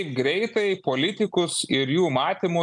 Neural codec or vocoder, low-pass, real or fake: none; 10.8 kHz; real